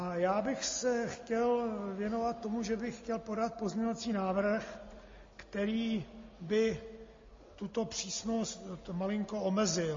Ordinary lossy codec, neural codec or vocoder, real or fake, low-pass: MP3, 32 kbps; none; real; 7.2 kHz